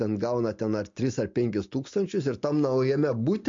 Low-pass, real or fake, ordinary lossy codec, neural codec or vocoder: 7.2 kHz; real; MP3, 48 kbps; none